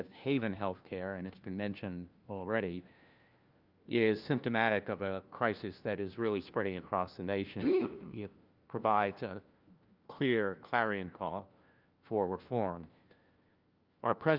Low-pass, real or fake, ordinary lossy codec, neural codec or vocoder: 5.4 kHz; fake; Opus, 24 kbps; codec, 16 kHz, 1 kbps, FunCodec, trained on LibriTTS, 50 frames a second